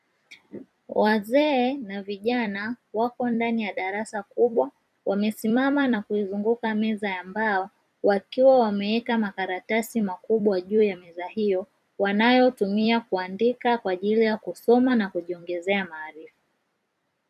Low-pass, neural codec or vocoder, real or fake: 14.4 kHz; vocoder, 44.1 kHz, 128 mel bands every 256 samples, BigVGAN v2; fake